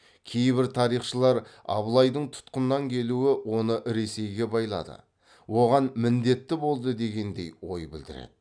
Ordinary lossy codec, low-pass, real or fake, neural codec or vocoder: none; 9.9 kHz; real; none